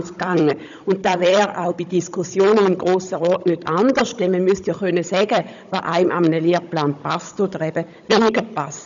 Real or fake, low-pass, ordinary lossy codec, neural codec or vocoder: fake; 7.2 kHz; none; codec, 16 kHz, 16 kbps, FunCodec, trained on Chinese and English, 50 frames a second